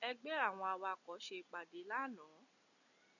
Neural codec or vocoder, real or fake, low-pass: none; real; 7.2 kHz